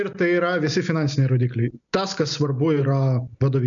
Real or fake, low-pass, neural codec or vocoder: real; 7.2 kHz; none